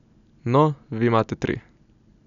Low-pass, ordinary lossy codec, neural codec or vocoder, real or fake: 7.2 kHz; none; none; real